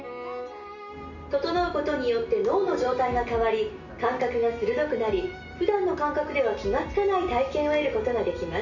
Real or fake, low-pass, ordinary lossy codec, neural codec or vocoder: real; 7.2 kHz; none; none